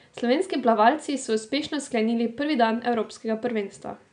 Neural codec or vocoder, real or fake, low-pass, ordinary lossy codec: none; real; 9.9 kHz; none